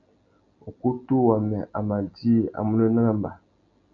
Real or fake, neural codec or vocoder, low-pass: real; none; 7.2 kHz